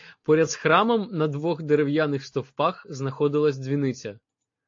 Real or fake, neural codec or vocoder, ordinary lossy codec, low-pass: real; none; AAC, 48 kbps; 7.2 kHz